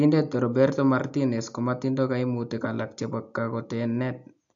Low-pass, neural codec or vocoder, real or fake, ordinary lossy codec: 7.2 kHz; none; real; AAC, 64 kbps